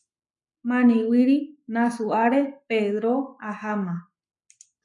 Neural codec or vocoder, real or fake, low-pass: codec, 44.1 kHz, 7.8 kbps, Pupu-Codec; fake; 10.8 kHz